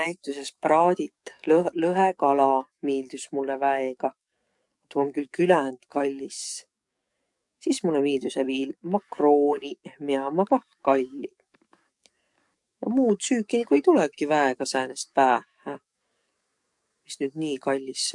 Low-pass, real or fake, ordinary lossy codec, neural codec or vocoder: 10.8 kHz; fake; MP3, 64 kbps; codec, 44.1 kHz, 7.8 kbps, DAC